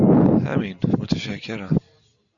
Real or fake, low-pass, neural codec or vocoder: real; 7.2 kHz; none